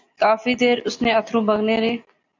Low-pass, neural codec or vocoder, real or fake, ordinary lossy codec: 7.2 kHz; none; real; AAC, 48 kbps